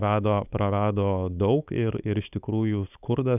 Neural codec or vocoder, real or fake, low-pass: codec, 16 kHz, 4.8 kbps, FACodec; fake; 3.6 kHz